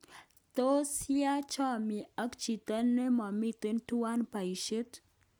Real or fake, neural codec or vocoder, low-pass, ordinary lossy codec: real; none; none; none